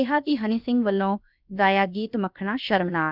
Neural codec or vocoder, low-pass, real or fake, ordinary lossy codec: codec, 16 kHz, about 1 kbps, DyCAST, with the encoder's durations; 5.4 kHz; fake; none